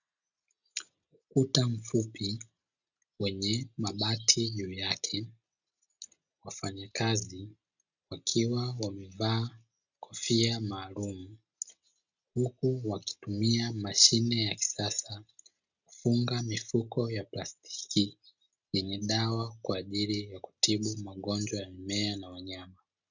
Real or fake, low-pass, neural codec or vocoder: real; 7.2 kHz; none